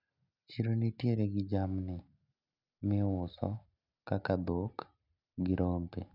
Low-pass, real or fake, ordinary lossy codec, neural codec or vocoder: 5.4 kHz; real; none; none